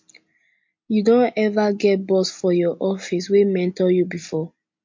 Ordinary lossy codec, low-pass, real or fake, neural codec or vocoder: MP3, 48 kbps; 7.2 kHz; real; none